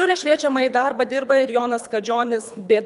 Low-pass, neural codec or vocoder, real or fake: 10.8 kHz; codec, 24 kHz, 3 kbps, HILCodec; fake